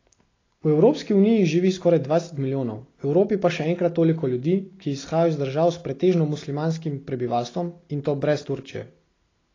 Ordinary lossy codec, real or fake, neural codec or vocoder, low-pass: AAC, 32 kbps; real; none; 7.2 kHz